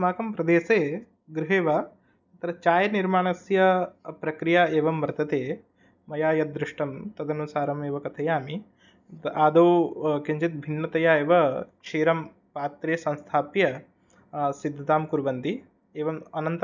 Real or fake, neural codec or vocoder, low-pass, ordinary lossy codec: real; none; 7.2 kHz; none